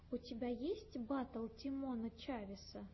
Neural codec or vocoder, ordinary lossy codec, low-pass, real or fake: none; MP3, 24 kbps; 7.2 kHz; real